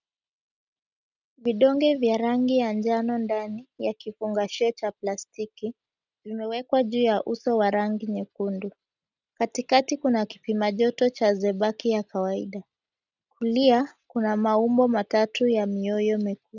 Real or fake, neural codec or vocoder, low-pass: real; none; 7.2 kHz